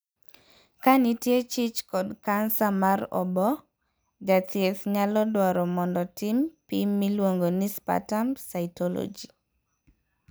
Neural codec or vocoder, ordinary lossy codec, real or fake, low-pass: none; none; real; none